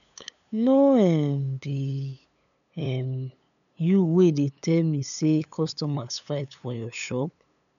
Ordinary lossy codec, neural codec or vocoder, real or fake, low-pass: none; codec, 16 kHz, 8 kbps, FunCodec, trained on LibriTTS, 25 frames a second; fake; 7.2 kHz